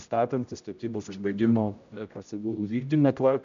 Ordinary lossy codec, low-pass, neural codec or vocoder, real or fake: MP3, 64 kbps; 7.2 kHz; codec, 16 kHz, 0.5 kbps, X-Codec, HuBERT features, trained on general audio; fake